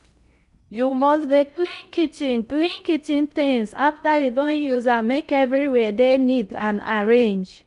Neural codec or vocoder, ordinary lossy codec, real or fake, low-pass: codec, 16 kHz in and 24 kHz out, 0.6 kbps, FocalCodec, streaming, 4096 codes; none; fake; 10.8 kHz